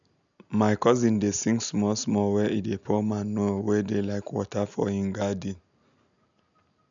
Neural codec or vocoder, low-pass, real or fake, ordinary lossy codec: none; 7.2 kHz; real; none